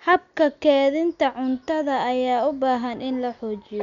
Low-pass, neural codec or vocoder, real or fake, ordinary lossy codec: 7.2 kHz; none; real; none